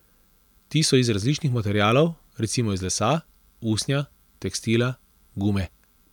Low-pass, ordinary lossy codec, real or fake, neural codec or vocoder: 19.8 kHz; none; real; none